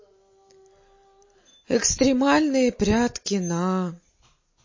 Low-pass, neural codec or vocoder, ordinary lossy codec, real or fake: 7.2 kHz; none; MP3, 32 kbps; real